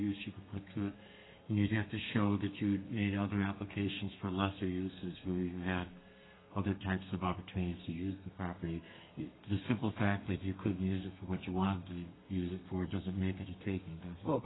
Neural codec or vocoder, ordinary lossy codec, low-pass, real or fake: codec, 32 kHz, 1.9 kbps, SNAC; AAC, 16 kbps; 7.2 kHz; fake